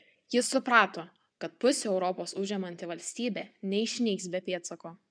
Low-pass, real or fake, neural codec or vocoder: 9.9 kHz; fake; vocoder, 24 kHz, 100 mel bands, Vocos